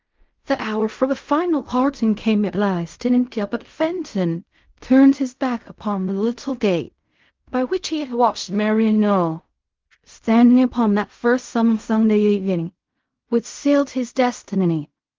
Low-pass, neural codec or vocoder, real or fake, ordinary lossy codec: 7.2 kHz; codec, 16 kHz in and 24 kHz out, 0.4 kbps, LongCat-Audio-Codec, fine tuned four codebook decoder; fake; Opus, 32 kbps